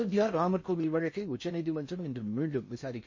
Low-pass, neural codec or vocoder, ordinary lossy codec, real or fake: 7.2 kHz; codec, 16 kHz in and 24 kHz out, 0.6 kbps, FocalCodec, streaming, 4096 codes; MP3, 32 kbps; fake